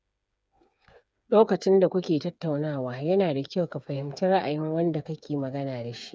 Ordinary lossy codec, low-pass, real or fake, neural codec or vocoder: none; none; fake; codec, 16 kHz, 8 kbps, FreqCodec, smaller model